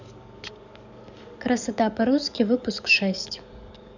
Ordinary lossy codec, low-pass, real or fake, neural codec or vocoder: none; 7.2 kHz; fake; codec, 44.1 kHz, 7.8 kbps, DAC